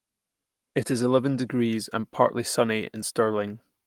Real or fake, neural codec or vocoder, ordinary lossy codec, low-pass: fake; codec, 44.1 kHz, 7.8 kbps, Pupu-Codec; Opus, 32 kbps; 19.8 kHz